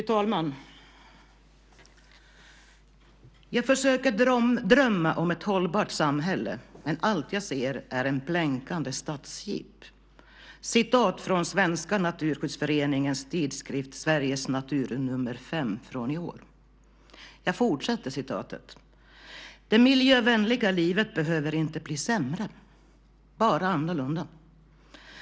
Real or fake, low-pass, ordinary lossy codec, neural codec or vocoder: real; none; none; none